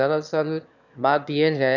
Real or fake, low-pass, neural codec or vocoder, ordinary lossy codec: fake; 7.2 kHz; autoencoder, 22.05 kHz, a latent of 192 numbers a frame, VITS, trained on one speaker; none